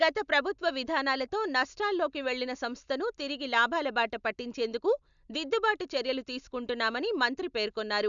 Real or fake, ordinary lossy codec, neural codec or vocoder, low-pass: real; MP3, 96 kbps; none; 7.2 kHz